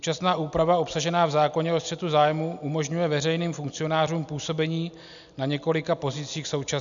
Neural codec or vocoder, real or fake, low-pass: none; real; 7.2 kHz